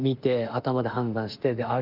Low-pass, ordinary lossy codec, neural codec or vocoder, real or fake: 5.4 kHz; Opus, 32 kbps; autoencoder, 48 kHz, 32 numbers a frame, DAC-VAE, trained on Japanese speech; fake